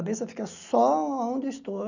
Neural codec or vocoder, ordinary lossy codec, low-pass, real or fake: none; none; 7.2 kHz; real